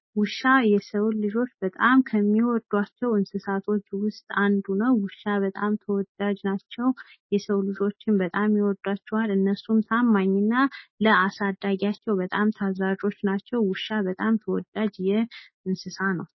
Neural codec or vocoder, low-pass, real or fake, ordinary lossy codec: none; 7.2 kHz; real; MP3, 24 kbps